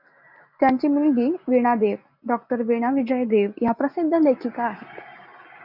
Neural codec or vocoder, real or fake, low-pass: none; real; 5.4 kHz